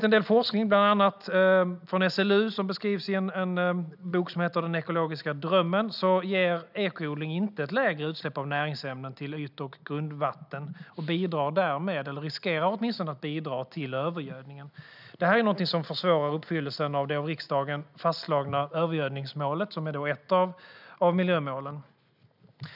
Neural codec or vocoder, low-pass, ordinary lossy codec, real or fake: none; 5.4 kHz; none; real